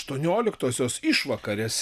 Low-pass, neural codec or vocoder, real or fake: 14.4 kHz; none; real